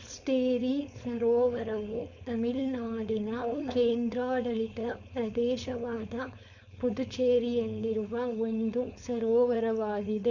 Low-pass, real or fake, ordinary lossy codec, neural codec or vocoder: 7.2 kHz; fake; none; codec, 16 kHz, 4.8 kbps, FACodec